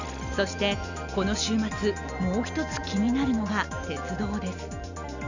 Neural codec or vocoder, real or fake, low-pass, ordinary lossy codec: none; real; 7.2 kHz; none